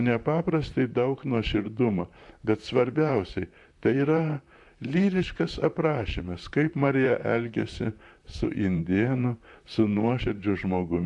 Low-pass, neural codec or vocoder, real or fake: 10.8 kHz; vocoder, 44.1 kHz, 128 mel bands, Pupu-Vocoder; fake